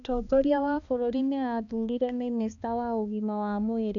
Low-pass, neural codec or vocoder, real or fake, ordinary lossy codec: 7.2 kHz; codec, 16 kHz, 2 kbps, X-Codec, HuBERT features, trained on balanced general audio; fake; none